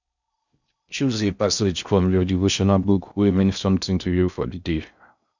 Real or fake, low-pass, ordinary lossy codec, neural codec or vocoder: fake; 7.2 kHz; none; codec, 16 kHz in and 24 kHz out, 0.6 kbps, FocalCodec, streaming, 4096 codes